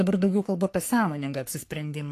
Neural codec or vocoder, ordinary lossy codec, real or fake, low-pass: codec, 44.1 kHz, 3.4 kbps, Pupu-Codec; AAC, 64 kbps; fake; 14.4 kHz